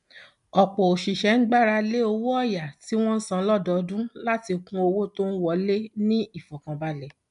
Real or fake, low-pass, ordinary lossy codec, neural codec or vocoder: real; 10.8 kHz; none; none